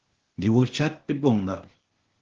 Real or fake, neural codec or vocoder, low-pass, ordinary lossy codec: fake; codec, 16 kHz, 0.8 kbps, ZipCodec; 7.2 kHz; Opus, 16 kbps